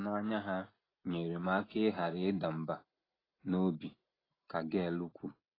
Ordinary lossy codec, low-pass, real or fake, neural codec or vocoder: AAC, 24 kbps; 5.4 kHz; real; none